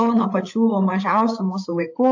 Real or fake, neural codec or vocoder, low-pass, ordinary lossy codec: fake; codec, 16 kHz, 8 kbps, FreqCodec, larger model; 7.2 kHz; AAC, 48 kbps